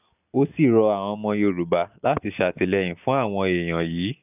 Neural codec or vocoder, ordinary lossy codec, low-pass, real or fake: none; none; 3.6 kHz; real